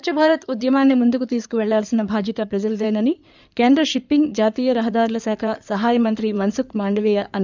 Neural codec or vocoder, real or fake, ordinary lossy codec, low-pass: codec, 16 kHz in and 24 kHz out, 2.2 kbps, FireRedTTS-2 codec; fake; none; 7.2 kHz